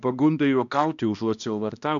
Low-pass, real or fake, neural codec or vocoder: 7.2 kHz; fake; codec, 16 kHz, 1 kbps, X-Codec, HuBERT features, trained on balanced general audio